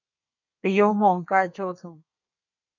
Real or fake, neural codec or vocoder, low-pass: fake; codec, 32 kHz, 1.9 kbps, SNAC; 7.2 kHz